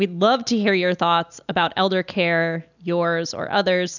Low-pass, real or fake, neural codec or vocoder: 7.2 kHz; real; none